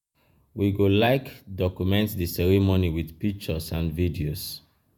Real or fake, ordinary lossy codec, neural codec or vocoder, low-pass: fake; none; vocoder, 48 kHz, 128 mel bands, Vocos; none